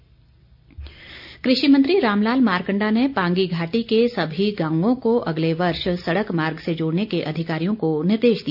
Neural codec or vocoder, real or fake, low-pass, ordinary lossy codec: none; real; 5.4 kHz; none